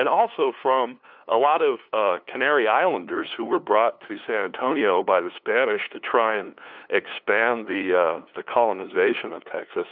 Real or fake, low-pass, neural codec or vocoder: fake; 5.4 kHz; codec, 16 kHz, 2 kbps, FunCodec, trained on LibriTTS, 25 frames a second